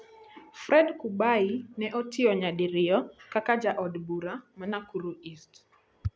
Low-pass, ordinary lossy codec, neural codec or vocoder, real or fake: none; none; none; real